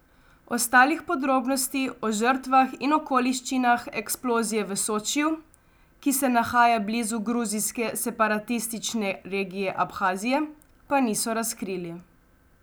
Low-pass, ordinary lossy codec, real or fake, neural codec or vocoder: none; none; real; none